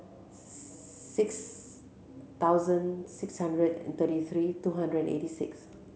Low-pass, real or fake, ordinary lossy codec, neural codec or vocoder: none; real; none; none